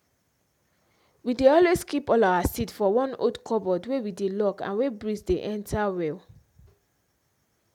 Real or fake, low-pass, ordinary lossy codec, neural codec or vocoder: real; 19.8 kHz; none; none